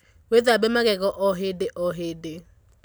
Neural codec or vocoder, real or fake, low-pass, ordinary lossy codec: none; real; none; none